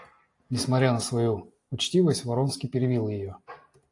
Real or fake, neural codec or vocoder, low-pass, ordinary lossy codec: real; none; 10.8 kHz; AAC, 48 kbps